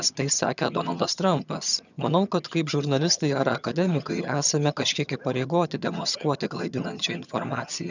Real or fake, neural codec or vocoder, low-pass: fake; vocoder, 22.05 kHz, 80 mel bands, HiFi-GAN; 7.2 kHz